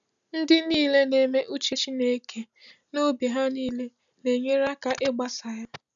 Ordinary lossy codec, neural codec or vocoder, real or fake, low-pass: none; none; real; 7.2 kHz